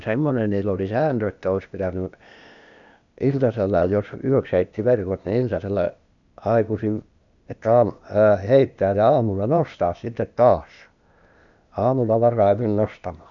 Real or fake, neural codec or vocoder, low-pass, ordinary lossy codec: fake; codec, 16 kHz, 0.8 kbps, ZipCodec; 7.2 kHz; none